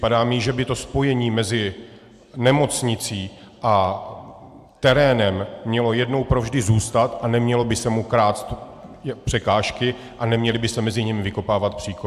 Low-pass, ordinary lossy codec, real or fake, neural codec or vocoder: 14.4 kHz; AAC, 96 kbps; real; none